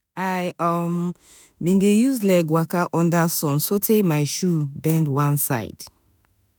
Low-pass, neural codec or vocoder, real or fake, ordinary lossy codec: none; autoencoder, 48 kHz, 32 numbers a frame, DAC-VAE, trained on Japanese speech; fake; none